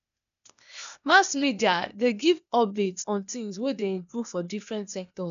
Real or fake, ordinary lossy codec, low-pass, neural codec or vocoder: fake; none; 7.2 kHz; codec, 16 kHz, 0.8 kbps, ZipCodec